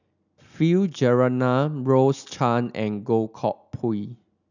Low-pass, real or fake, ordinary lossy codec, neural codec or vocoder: 7.2 kHz; real; none; none